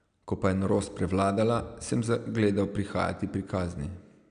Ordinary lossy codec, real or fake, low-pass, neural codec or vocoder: none; real; 9.9 kHz; none